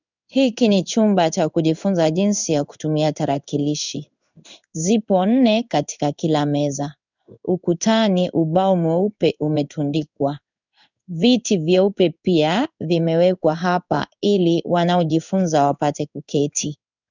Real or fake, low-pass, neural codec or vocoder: fake; 7.2 kHz; codec, 16 kHz in and 24 kHz out, 1 kbps, XY-Tokenizer